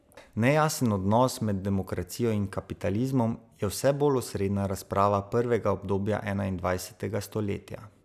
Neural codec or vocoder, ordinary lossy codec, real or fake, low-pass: none; none; real; 14.4 kHz